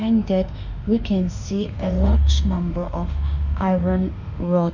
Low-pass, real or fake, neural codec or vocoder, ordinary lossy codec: 7.2 kHz; fake; autoencoder, 48 kHz, 32 numbers a frame, DAC-VAE, trained on Japanese speech; none